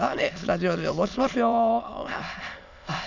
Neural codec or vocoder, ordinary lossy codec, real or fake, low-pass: autoencoder, 22.05 kHz, a latent of 192 numbers a frame, VITS, trained on many speakers; none; fake; 7.2 kHz